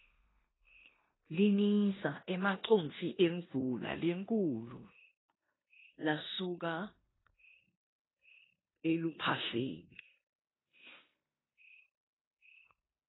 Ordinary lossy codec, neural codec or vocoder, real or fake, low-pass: AAC, 16 kbps; codec, 16 kHz in and 24 kHz out, 0.9 kbps, LongCat-Audio-Codec, four codebook decoder; fake; 7.2 kHz